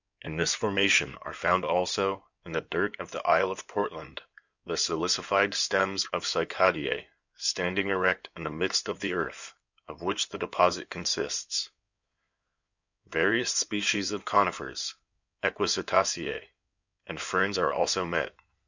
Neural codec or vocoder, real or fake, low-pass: codec, 16 kHz in and 24 kHz out, 2.2 kbps, FireRedTTS-2 codec; fake; 7.2 kHz